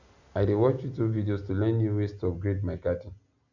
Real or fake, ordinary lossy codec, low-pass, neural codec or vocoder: real; none; 7.2 kHz; none